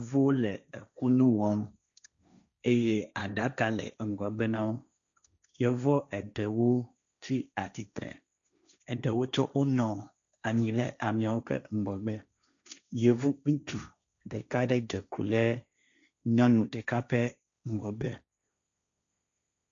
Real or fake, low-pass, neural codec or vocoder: fake; 7.2 kHz; codec, 16 kHz, 1.1 kbps, Voila-Tokenizer